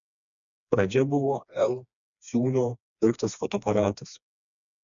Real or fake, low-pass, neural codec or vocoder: fake; 7.2 kHz; codec, 16 kHz, 2 kbps, FreqCodec, smaller model